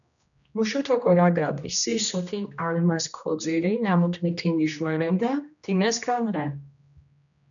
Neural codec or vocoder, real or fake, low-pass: codec, 16 kHz, 1 kbps, X-Codec, HuBERT features, trained on general audio; fake; 7.2 kHz